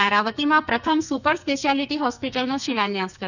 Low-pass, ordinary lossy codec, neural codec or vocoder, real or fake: 7.2 kHz; none; codec, 44.1 kHz, 2.6 kbps, SNAC; fake